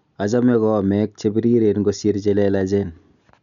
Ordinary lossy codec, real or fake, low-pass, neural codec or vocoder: none; real; 7.2 kHz; none